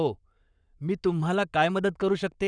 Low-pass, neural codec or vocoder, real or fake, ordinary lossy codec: 9.9 kHz; codec, 44.1 kHz, 7.8 kbps, Pupu-Codec; fake; none